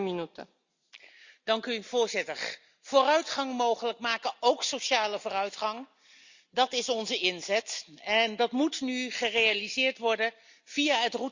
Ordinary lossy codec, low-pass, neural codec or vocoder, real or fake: Opus, 64 kbps; 7.2 kHz; none; real